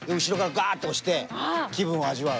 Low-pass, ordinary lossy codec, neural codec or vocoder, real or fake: none; none; none; real